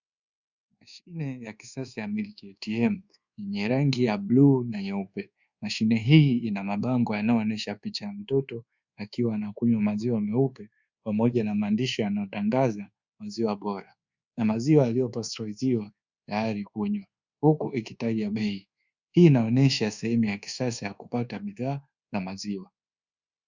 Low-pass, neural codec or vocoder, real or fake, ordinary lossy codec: 7.2 kHz; codec, 24 kHz, 1.2 kbps, DualCodec; fake; Opus, 64 kbps